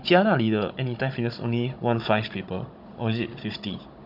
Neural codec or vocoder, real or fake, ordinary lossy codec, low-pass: codec, 16 kHz, 16 kbps, FunCodec, trained on Chinese and English, 50 frames a second; fake; none; 5.4 kHz